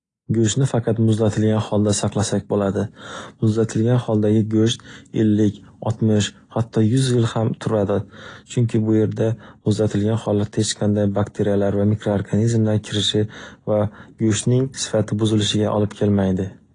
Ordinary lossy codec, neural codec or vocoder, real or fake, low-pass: AAC, 32 kbps; none; real; 9.9 kHz